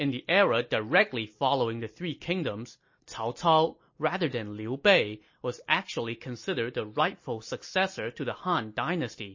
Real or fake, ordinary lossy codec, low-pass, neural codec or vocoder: real; MP3, 32 kbps; 7.2 kHz; none